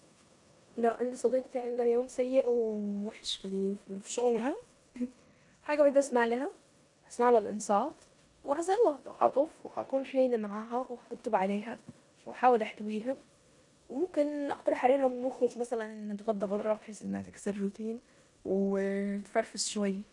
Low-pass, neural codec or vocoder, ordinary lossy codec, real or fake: 10.8 kHz; codec, 16 kHz in and 24 kHz out, 0.9 kbps, LongCat-Audio-Codec, four codebook decoder; none; fake